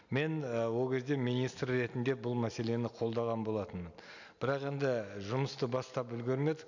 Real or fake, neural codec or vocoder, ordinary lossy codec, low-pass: real; none; none; 7.2 kHz